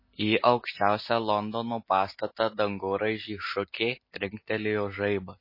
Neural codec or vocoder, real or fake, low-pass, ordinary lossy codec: none; real; 5.4 kHz; MP3, 24 kbps